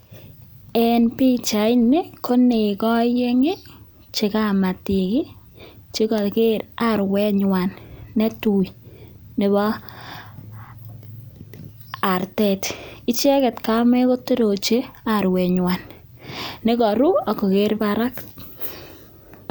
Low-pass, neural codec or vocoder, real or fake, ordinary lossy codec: none; none; real; none